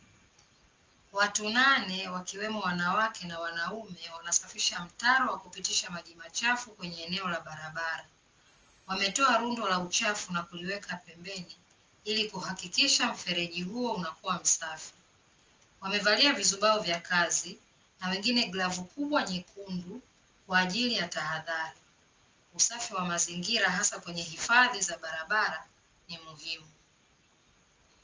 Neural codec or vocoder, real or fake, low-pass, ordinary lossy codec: none; real; 7.2 kHz; Opus, 24 kbps